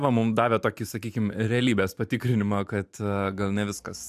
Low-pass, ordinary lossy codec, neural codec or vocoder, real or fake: 14.4 kHz; AAC, 96 kbps; vocoder, 44.1 kHz, 128 mel bands every 512 samples, BigVGAN v2; fake